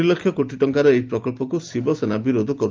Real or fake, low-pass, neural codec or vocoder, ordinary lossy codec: real; 7.2 kHz; none; Opus, 24 kbps